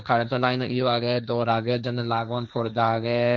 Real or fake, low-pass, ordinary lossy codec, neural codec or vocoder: fake; none; none; codec, 16 kHz, 1.1 kbps, Voila-Tokenizer